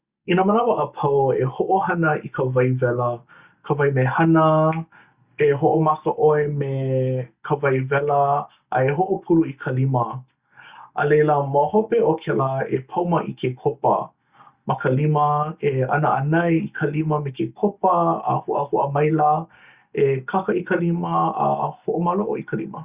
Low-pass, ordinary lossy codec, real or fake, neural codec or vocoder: 3.6 kHz; Opus, 64 kbps; real; none